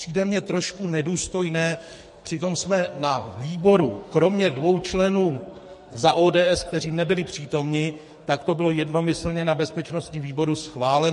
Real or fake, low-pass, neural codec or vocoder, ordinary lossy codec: fake; 14.4 kHz; codec, 44.1 kHz, 2.6 kbps, SNAC; MP3, 48 kbps